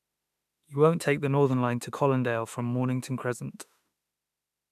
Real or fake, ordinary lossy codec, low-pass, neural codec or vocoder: fake; none; 14.4 kHz; autoencoder, 48 kHz, 32 numbers a frame, DAC-VAE, trained on Japanese speech